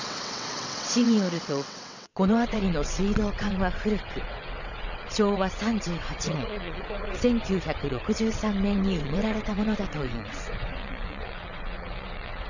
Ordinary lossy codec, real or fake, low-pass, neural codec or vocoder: none; fake; 7.2 kHz; vocoder, 22.05 kHz, 80 mel bands, WaveNeXt